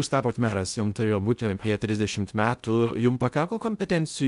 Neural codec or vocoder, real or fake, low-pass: codec, 16 kHz in and 24 kHz out, 0.6 kbps, FocalCodec, streaming, 2048 codes; fake; 10.8 kHz